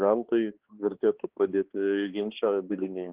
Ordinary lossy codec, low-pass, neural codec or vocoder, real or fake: Opus, 16 kbps; 3.6 kHz; codec, 16 kHz, 2 kbps, X-Codec, HuBERT features, trained on balanced general audio; fake